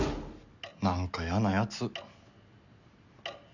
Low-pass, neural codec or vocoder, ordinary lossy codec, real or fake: 7.2 kHz; none; none; real